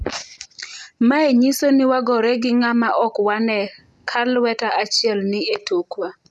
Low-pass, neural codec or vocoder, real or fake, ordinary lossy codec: none; none; real; none